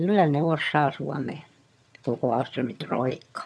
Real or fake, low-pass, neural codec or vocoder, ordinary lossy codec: fake; none; vocoder, 22.05 kHz, 80 mel bands, HiFi-GAN; none